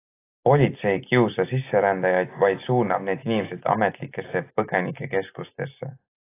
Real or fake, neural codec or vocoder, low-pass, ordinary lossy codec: real; none; 3.6 kHz; AAC, 24 kbps